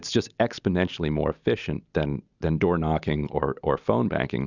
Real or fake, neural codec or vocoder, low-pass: real; none; 7.2 kHz